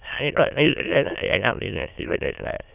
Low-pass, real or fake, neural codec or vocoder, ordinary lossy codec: 3.6 kHz; fake; autoencoder, 22.05 kHz, a latent of 192 numbers a frame, VITS, trained on many speakers; none